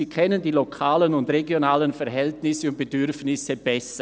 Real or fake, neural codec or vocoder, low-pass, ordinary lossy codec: real; none; none; none